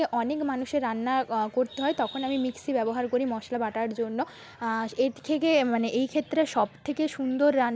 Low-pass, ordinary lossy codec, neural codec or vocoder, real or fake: none; none; none; real